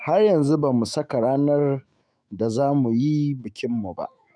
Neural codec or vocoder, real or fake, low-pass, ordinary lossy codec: autoencoder, 48 kHz, 128 numbers a frame, DAC-VAE, trained on Japanese speech; fake; 9.9 kHz; none